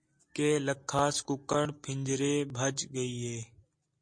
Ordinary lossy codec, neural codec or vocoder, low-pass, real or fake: MP3, 48 kbps; none; 9.9 kHz; real